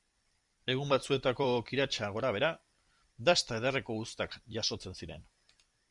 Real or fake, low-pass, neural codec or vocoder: fake; 10.8 kHz; vocoder, 44.1 kHz, 128 mel bands every 256 samples, BigVGAN v2